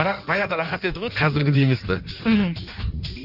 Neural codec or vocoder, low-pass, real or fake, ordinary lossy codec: codec, 16 kHz in and 24 kHz out, 1.1 kbps, FireRedTTS-2 codec; 5.4 kHz; fake; none